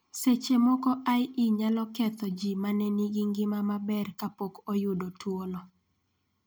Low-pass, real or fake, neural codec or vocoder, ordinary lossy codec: none; real; none; none